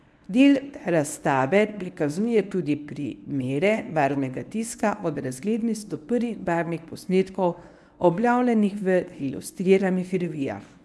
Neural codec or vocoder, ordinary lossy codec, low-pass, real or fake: codec, 24 kHz, 0.9 kbps, WavTokenizer, medium speech release version 1; none; none; fake